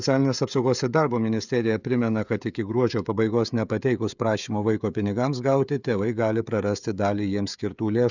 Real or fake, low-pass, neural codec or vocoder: fake; 7.2 kHz; codec, 16 kHz, 16 kbps, FreqCodec, smaller model